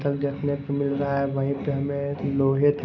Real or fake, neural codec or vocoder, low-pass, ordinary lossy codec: real; none; 7.2 kHz; none